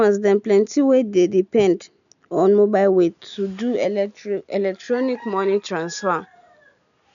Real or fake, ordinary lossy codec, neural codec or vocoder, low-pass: real; none; none; 7.2 kHz